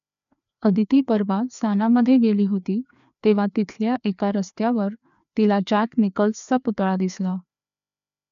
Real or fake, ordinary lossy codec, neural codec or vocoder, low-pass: fake; none; codec, 16 kHz, 2 kbps, FreqCodec, larger model; 7.2 kHz